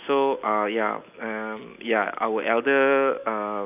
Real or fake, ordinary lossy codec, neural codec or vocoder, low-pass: real; none; none; 3.6 kHz